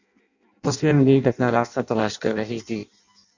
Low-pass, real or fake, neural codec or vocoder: 7.2 kHz; fake; codec, 16 kHz in and 24 kHz out, 0.6 kbps, FireRedTTS-2 codec